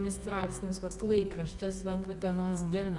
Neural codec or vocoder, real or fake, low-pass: codec, 24 kHz, 0.9 kbps, WavTokenizer, medium music audio release; fake; 10.8 kHz